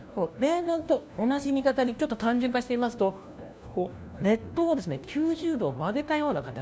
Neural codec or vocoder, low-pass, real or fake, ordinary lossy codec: codec, 16 kHz, 1 kbps, FunCodec, trained on LibriTTS, 50 frames a second; none; fake; none